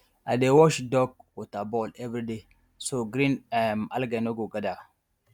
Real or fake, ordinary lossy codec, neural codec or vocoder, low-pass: real; none; none; 19.8 kHz